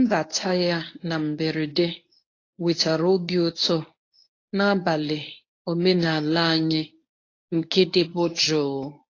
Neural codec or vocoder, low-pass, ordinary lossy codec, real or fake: codec, 24 kHz, 0.9 kbps, WavTokenizer, medium speech release version 1; 7.2 kHz; AAC, 32 kbps; fake